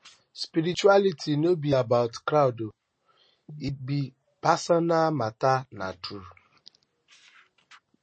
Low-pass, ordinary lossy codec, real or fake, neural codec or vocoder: 9.9 kHz; MP3, 32 kbps; real; none